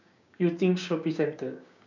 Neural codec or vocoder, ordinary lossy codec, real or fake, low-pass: codec, 16 kHz, 6 kbps, DAC; none; fake; 7.2 kHz